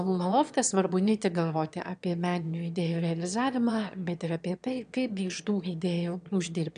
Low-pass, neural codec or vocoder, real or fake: 9.9 kHz; autoencoder, 22.05 kHz, a latent of 192 numbers a frame, VITS, trained on one speaker; fake